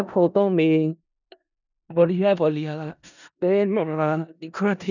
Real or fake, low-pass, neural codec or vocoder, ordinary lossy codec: fake; 7.2 kHz; codec, 16 kHz in and 24 kHz out, 0.4 kbps, LongCat-Audio-Codec, four codebook decoder; none